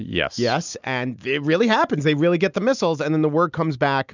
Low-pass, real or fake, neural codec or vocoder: 7.2 kHz; real; none